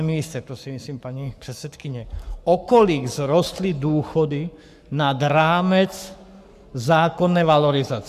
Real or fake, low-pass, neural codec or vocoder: fake; 14.4 kHz; codec, 44.1 kHz, 7.8 kbps, Pupu-Codec